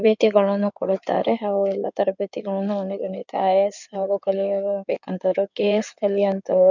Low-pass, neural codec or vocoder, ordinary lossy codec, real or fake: 7.2 kHz; codec, 16 kHz in and 24 kHz out, 2.2 kbps, FireRedTTS-2 codec; none; fake